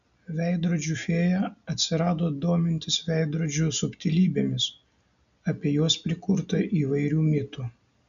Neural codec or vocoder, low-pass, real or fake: none; 7.2 kHz; real